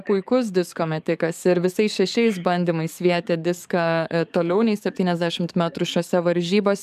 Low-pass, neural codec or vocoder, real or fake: 14.4 kHz; codec, 44.1 kHz, 7.8 kbps, DAC; fake